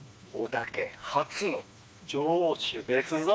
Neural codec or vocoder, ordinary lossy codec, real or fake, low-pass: codec, 16 kHz, 2 kbps, FreqCodec, smaller model; none; fake; none